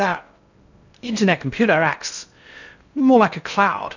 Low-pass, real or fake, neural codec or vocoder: 7.2 kHz; fake; codec, 16 kHz in and 24 kHz out, 0.6 kbps, FocalCodec, streaming, 2048 codes